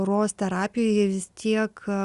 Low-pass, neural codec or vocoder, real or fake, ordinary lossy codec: 10.8 kHz; none; real; Opus, 64 kbps